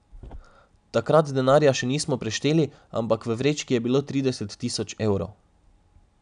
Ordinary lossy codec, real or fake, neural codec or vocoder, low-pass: none; real; none; 9.9 kHz